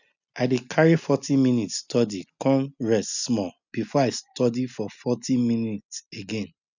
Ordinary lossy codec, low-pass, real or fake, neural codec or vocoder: none; 7.2 kHz; real; none